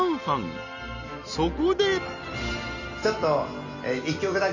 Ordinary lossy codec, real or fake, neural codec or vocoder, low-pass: none; real; none; 7.2 kHz